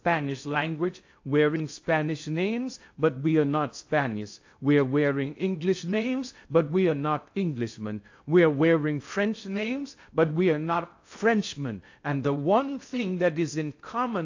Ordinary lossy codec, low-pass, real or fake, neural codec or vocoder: AAC, 48 kbps; 7.2 kHz; fake; codec, 16 kHz in and 24 kHz out, 0.6 kbps, FocalCodec, streaming, 2048 codes